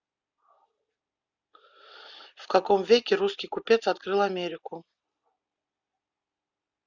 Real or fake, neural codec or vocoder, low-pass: real; none; 7.2 kHz